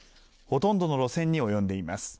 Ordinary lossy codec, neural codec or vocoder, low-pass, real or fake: none; none; none; real